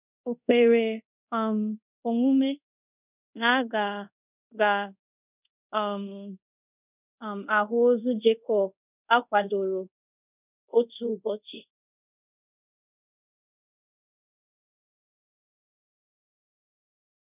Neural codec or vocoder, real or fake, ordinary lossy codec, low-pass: codec, 24 kHz, 0.5 kbps, DualCodec; fake; none; 3.6 kHz